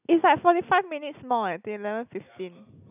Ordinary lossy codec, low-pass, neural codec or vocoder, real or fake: none; 3.6 kHz; none; real